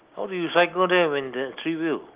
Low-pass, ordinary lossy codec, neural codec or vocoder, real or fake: 3.6 kHz; Opus, 32 kbps; none; real